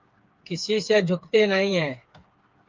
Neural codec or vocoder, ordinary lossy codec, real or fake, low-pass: codec, 16 kHz, 4 kbps, FreqCodec, smaller model; Opus, 32 kbps; fake; 7.2 kHz